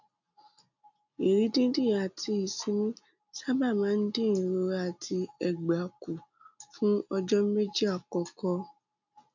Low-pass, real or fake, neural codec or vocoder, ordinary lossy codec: 7.2 kHz; real; none; none